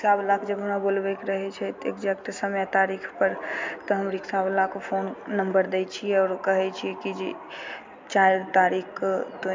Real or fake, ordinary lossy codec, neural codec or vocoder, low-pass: real; MP3, 64 kbps; none; 7.2 kHz